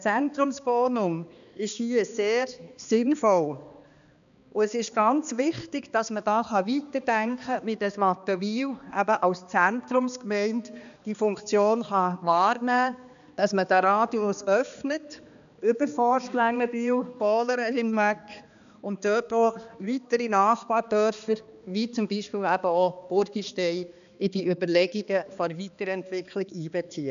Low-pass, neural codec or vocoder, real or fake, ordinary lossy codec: 7.2 kHz; codec, 16 kHz, 2 kbps, X-Codec, HuBERT features, trained on balanced general audio; fake; none